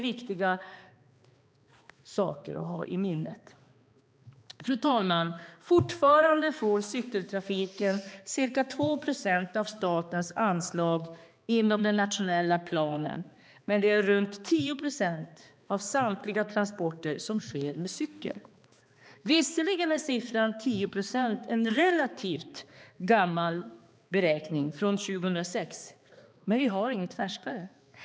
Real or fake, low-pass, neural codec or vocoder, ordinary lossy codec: fake; none; codec, 16 kHz, 2 kbps, X-Codec, HuBERT features, trained on balanced general audio; none